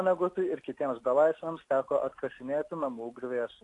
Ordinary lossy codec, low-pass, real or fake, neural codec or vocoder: AAC, 48 kbps; 10.8 kHz; real; none